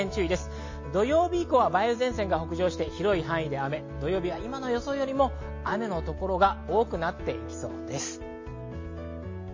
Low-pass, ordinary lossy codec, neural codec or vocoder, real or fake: 7.2 kHz; MP3, 32 kbps; none; real